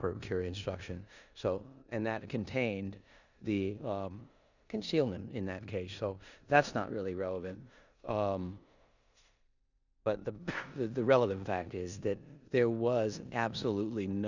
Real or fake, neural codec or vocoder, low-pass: fake; codec, 16 kHz in and 24 kHz out, 0.9 kbps, LongCat-Audio-Codec, four codebook decoder; 7.2 kHz